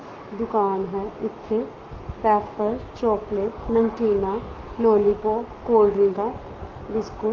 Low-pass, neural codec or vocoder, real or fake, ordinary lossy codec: 7.2 kHz; codec, 44.1 kHz, 7.8 kbps, Pupu-Codec; fake; Opus, 24 kbps